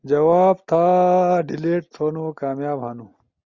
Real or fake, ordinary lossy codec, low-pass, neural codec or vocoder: real; Opus, 64 kbps; 7.2 kHz; none